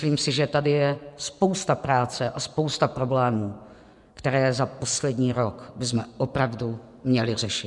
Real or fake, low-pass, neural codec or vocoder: fake; 10.8 kHz; vocoder, 24 kHz, 100 mel bands, Vocos